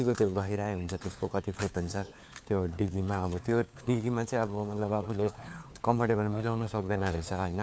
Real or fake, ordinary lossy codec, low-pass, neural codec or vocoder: fake; none; none; codec, 16 kHz, 4 kbps, FunCodec, trained on LibriTTS, 50 frames a second